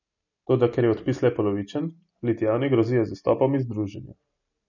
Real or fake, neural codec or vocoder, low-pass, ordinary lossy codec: real; none; 7.2 kHz; none